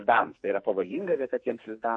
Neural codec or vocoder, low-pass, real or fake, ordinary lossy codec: codec, 44.1 kHz, 2.6 kbps, SNAC; 9.9 kHz; fake; MP3, 48 kbps